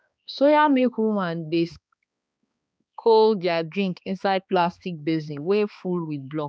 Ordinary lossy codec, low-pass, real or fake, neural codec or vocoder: none; none; fake; codec, 16 kHz, 2 kbps, X-Codec, HuBERT features, trained on balanced general audio